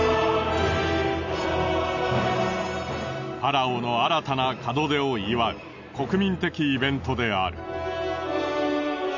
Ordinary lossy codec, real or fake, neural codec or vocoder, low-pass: none; real; none; 7.2 kHz